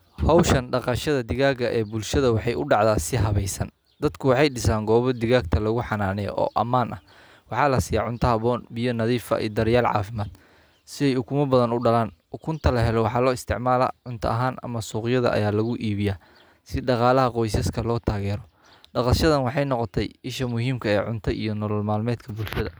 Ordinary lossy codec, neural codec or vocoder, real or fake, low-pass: none; none; real; none